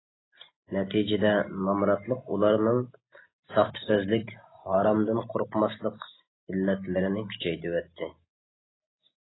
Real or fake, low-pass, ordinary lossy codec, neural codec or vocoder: real; 7.2 kHz; AAC, 16 kbps; none